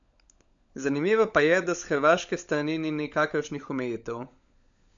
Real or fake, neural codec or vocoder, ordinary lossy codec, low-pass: fake; codec, 16 kHz, 16 kbps, FunCodec, trained on LibriTTS, 50 frames a second; MP3, 64 kbps; 7.2 kHz